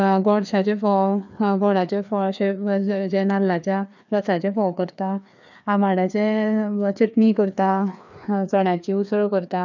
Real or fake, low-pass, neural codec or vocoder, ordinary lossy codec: fake; 7.2 kHz; codec, 16 kHz, 2 kbps, FreqCodec, larger model; none